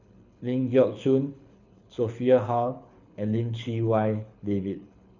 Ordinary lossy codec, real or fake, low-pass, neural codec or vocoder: none; fake; 7.2 kHz; codec, 24 kHz, 6 kbps, HILCodec